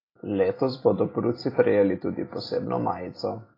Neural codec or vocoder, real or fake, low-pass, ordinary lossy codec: none; real; 5.4 kHz; AAC, 24 kbps